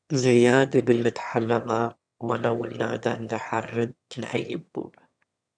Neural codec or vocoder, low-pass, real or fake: autoencoder, 22.05 kHz, a latent of 192 numbers a frame, VITS, trained on one speaker; 9.9 kHz; fake